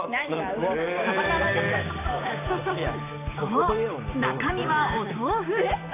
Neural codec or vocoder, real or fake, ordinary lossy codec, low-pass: codec, 16 kHz, 6 kbps, DAC; fake; AAC, 32 kbps; 3.6 kHz